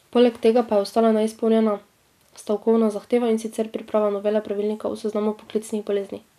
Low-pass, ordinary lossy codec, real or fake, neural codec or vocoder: 14.4 kHz; none; real; none